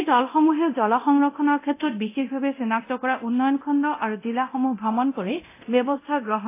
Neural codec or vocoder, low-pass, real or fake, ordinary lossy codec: codec, 24 kHz, 0.9 kbps, DualCodec; 3.6 kHz; fake; AAC, 24 kbps